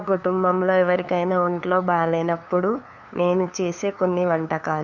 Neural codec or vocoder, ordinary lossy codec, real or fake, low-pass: codec, 16 kHz, 2 kbps, FunCodec, trained on LibriTTS, 25 frames a second; none; fake; 7.2 kHz